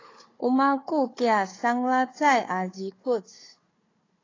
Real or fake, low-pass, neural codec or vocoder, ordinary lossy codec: fake; 7.2 kHz; codec, 16 kHz, 4 kbps, FunCodec, trained on Chinese and English, 50 frames a second; AAC, 32 kbps